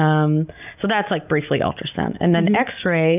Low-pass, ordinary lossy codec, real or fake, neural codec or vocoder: 3.6 kHz; AAC, 32 kbps; real; none